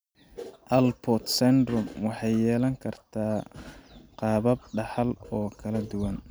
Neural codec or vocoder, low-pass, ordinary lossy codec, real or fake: none; none; none; real